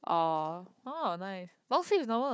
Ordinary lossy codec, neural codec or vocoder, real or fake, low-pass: none; codec, 16 kHz, 4 kbps, FunCodec, trained on Chinese and English, 50 frames a second; fake; none